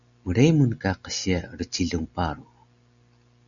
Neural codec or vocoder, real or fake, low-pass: none; real; 7.2 kHz